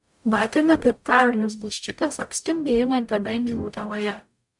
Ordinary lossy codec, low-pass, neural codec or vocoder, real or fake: MP3, 64 kbps; 10.8 kHz; codec, 44.1 kHz, 0.9 kbps, DAC; fake